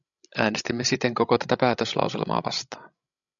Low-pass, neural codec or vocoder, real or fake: 7.2 kHz; codec, 16 kHz, 16 kbps, FreqCodec, larger model; fake